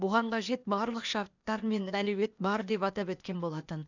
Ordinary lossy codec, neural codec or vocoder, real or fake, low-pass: none; codec, 16 kHz, 0.8 kbps, ZipCodec; fake; 7.2 kHz